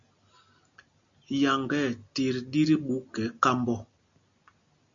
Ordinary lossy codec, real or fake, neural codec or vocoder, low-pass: MP3, 64 kbps; real; none; 7.2 kHz